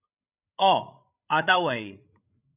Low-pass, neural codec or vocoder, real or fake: 3.6 kHz; codec, 16 kHz, 16 kbps, FreqCodec, larger model; fake